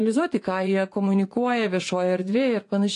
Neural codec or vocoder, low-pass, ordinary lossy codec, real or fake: vocoder, 24 kHz, 100 mel bands, Vocos; 10.8 kHz; AAC, 48 kbps; fake